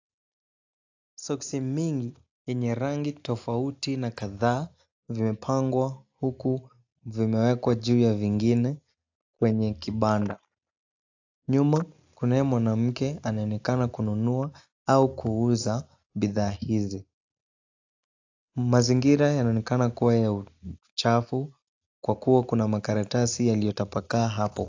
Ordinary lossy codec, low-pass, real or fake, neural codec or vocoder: AAC, 48 kbps; 7.2 kHz; real; none